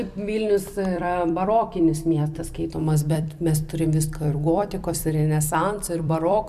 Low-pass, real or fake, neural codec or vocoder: 14.4 kHz; fake; vocoder, 44.1 kHz, 128 mel bands every 256 samples, BigVGAN v2